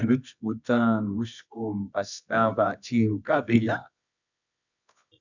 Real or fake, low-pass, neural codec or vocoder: fake; 7.2 kHz; codec, 24 kHz, 0.9 kbps, WavTokenizer, medium music audio release